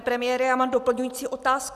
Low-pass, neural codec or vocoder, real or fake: 14.4 kHz; none; real